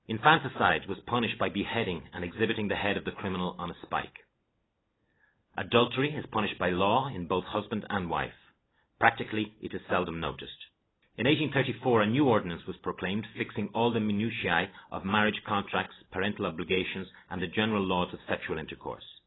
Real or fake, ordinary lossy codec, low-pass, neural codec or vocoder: real; AAC, 16 kbps; 7.2 kHz; none